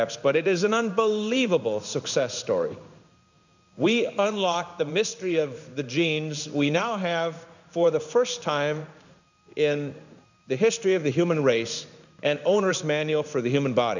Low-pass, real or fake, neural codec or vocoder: 7.2 kHz; fake; codec, 16 kHz in and 24 kHz out, 1 kbps, XY-Tokenizer